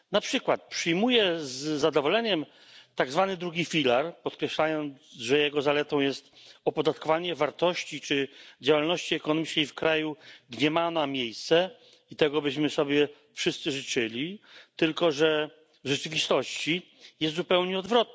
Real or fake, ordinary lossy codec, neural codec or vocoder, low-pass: real; none; none; none